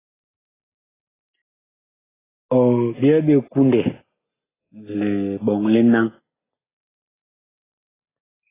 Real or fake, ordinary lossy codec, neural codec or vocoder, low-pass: real; AAC, 16 kbps; none; 3.6 kHz